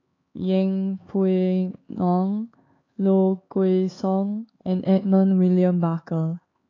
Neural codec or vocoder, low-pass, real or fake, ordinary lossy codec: codec, 16 kHz, 4 kbps, X-Codec, HuBERT features, trained on LibriSpeech; 7.2 kHz; fake; AAC, 32 kbps